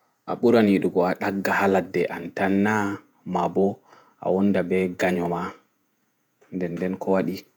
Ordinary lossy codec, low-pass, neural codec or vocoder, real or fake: none; none; vocoder, 48 kHz, 128 mel bands, Vocos; fake